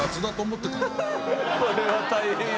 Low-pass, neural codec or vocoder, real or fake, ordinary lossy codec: none; none; real; none